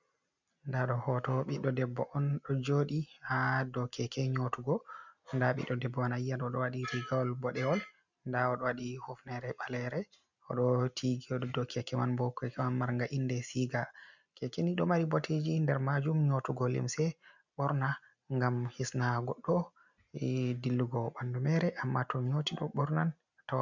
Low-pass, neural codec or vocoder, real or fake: 7.2 kHz; none; real